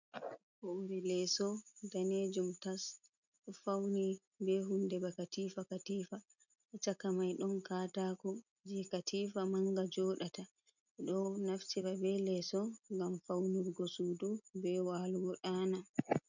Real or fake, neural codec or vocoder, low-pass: real; none; 7.2 kHz